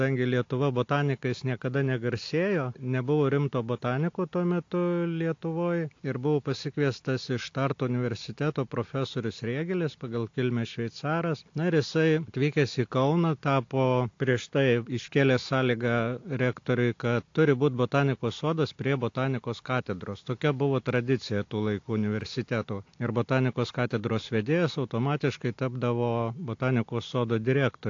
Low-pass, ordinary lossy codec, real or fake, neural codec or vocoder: 7.2 kHz; AAC, 48 kbps; real; none